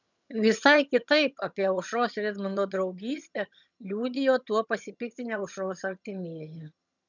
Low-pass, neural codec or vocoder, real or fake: 7.2 kHz; vocoder, 22.05 kHz, 80 mel bands, HiFi-GAN; fake